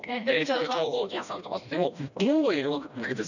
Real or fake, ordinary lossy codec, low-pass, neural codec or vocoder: fake; none; 7.2 kHz; codec, 16 kHz, 1 kbps, FreqCodec, smaller model